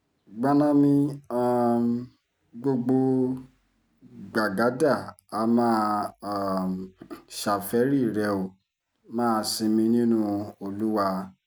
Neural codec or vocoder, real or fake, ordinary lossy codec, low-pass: none; real; none; none